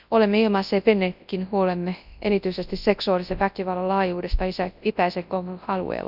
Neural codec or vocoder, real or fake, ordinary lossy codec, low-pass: codec, 24 kHz, 0.9 kbps, WavTokenizer, large speech release; fake; none; 5.4 kHz